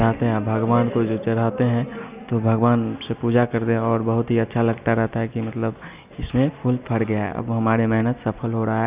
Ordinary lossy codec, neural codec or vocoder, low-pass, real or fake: Opus, 64 kbps; none; 3.6 kHz; real